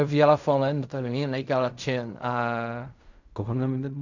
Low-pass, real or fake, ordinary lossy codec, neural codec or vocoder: 7.2 kHz; fake; none; codec, 16 kHz in and 24 kHz out, 0.4 kbps, LongCat-Audio-Codec, fine tuned four codebook decoder